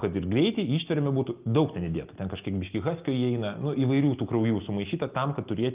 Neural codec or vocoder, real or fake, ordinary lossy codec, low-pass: none; real; Opus, 32 kbps; 3.6 kHz